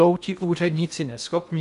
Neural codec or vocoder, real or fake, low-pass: codec, 16 kHz in and 24 kHz out, 0.8 kbps, FocalCodec, streaming, 65536 codes; fake; 10.8 kHz